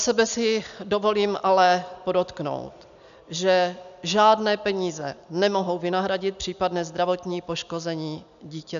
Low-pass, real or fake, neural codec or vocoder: 7.2 kHz; real; none